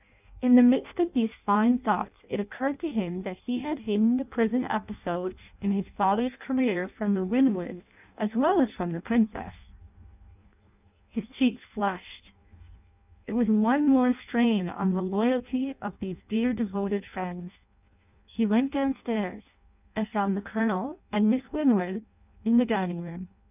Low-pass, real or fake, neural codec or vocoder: 3.6 kHz; fake; codec, 16 kHz in and 24 kHz out, 0.6 kbps, FireRedTTS-2 codec